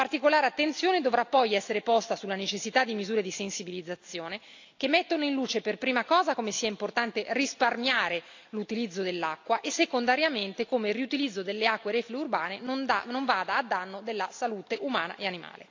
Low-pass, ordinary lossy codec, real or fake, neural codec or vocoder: 7.2 kHz; AAC, 48 kbps; real; none